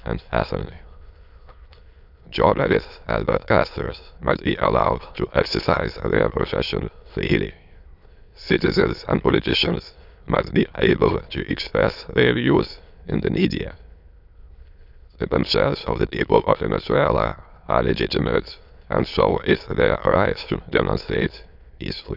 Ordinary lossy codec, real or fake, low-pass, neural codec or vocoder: none; fake; 5.4 kHz; autoencoder, 22.05 kHz, a latent of 192 numbers a frame, VITS, trained on many speakers